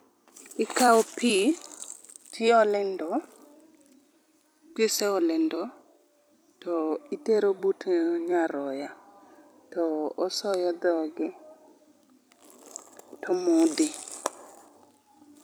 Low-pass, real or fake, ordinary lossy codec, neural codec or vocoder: none; fake; none; vocoder, 44.1 kHz, 128 mel bands every 256 samples, BigVGAN v2